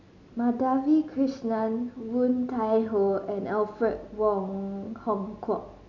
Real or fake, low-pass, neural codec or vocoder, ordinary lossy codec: real; 7.2 kHz; none; none